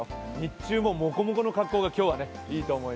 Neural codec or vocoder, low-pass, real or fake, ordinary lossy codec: none; none; real; none